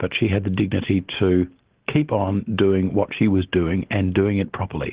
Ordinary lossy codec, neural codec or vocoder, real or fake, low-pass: Opus, 16 kbps; none; real; 3.6 kHz